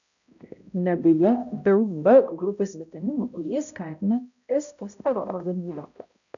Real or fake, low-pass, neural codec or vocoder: fake; 7.2 kHz; codec, 16 kHz, 0.5 kbps, X-Codec, HuBERT features, trained on balanced general audio